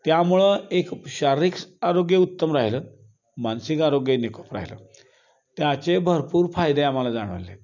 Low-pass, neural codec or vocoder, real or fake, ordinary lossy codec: 7.2 kHz; none; real; none